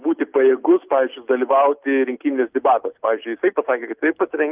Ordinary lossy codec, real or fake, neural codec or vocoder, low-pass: Opus, 24 kbps; real; none; 3.6 kHz